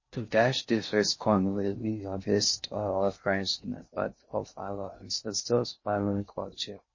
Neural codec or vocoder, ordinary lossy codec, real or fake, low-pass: codec, 16 kHz in and 24 kHz out, 0.6 kbps, FocalCodec, streaming, 4096 codes; MP3, 32 kbps; fake; 7.2 kHz